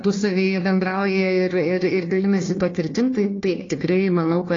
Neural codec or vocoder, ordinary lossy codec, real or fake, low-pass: codec, 16 kHz, 1 kbps, FunCodec, trained on Chinese and English, 50 frames a second; AAC, 32 kbps; fake; 7.2 kHz